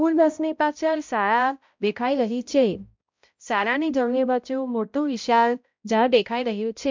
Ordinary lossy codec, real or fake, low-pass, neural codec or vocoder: MP3, 64 kbps; fake; 7.2 kHz; codec, 16 kHz, 0.5 kbps, X-Codec, HuBERT features, trained on balanced general audio